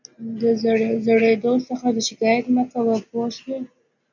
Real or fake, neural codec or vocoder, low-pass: real; none; 7.2 kHz